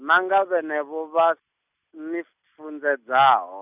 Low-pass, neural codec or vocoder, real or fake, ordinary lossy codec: 3.6 kHz; none; real; none